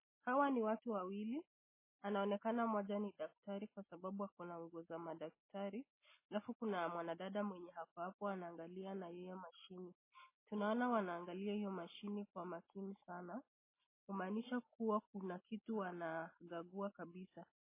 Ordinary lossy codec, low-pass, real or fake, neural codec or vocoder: MP3, 16 kbps; 3.6 kHz; real; none